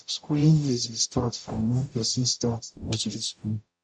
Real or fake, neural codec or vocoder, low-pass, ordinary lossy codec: fake; codec, 44.1 kHz, 0.9 kbps, DAC; 9.9 kHz; none